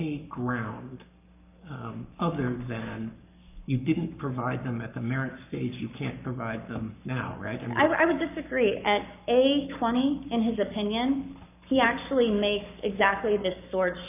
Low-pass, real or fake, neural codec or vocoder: 3.6 kHz; fake; codec, 44.1 kHz, 7.8 kbps, Pupu-Codec